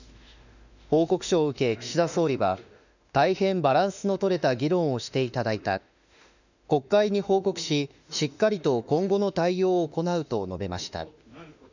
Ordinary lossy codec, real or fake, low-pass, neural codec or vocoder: none; fake; 7.2 kHz; autoencoder, 48 kHz, 32 numbers a frame, DAC-VAE, trained on Japanese speech